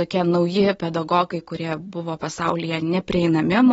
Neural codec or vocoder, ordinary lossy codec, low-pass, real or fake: none; AAC, 24 kbps; 19.8 kHz; real